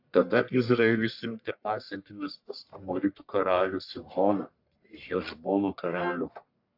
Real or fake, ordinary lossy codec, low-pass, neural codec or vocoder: fake; AAC, 48 kbps; 5.4 kHz; codec, 44.1 kHz, 1.7 kbps, Pupu-Codec